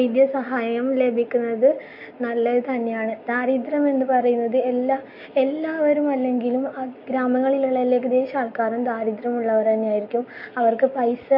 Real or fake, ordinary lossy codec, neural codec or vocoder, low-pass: real; MP3, 32 kbps; none; 5.4 kHz